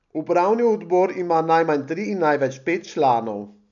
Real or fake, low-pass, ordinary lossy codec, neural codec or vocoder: real; 7.2 kHz; none; none